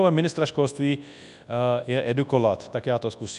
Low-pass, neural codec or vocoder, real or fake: 10.8 kHz; codec, 24 kHz, 0.9 kbps, WavTokenizer, large speech release; fake